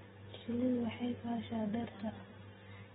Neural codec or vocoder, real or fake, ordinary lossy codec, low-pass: none; real; AAC, 16 kbps; 19.8 kHz